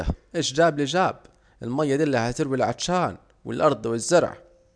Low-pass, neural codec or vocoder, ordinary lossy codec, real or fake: 9.9 kHz; none; none; real